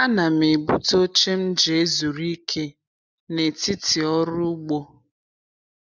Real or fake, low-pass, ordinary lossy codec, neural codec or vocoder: real; 7.2 kHz; none; none